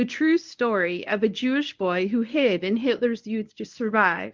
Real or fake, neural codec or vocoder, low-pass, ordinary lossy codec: fake; codec, 24 kHz, 0.9 kbps, WavTokenizer, medium speech release version 1; 7.2 kHz; Opus, 24 kbps